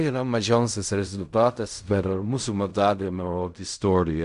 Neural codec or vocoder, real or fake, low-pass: codec, 16 kHz in and 24 kHz out, 0.4 kbps, LongCat-Audio-Codec, fine tuned four codebook decoder; fake; 10.8 kHz